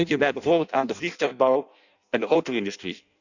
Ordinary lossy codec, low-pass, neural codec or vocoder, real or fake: none; 7.2 kHz; codec, 16 kHz in and 24 kHz out, 0.6 kbps, FireRedTTS-2 codec; fake